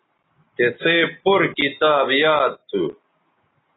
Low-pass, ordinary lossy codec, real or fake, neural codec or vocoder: 7.2 kHz; AAC, 16 kbps; real; none